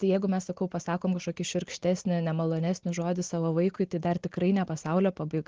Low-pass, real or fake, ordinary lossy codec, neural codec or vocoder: 7.2 kHz; real; Opus, 16 kbps; none